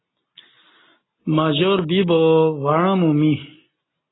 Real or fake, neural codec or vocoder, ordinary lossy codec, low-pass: real; none; AAC, 16 kbps; 7.2 kHz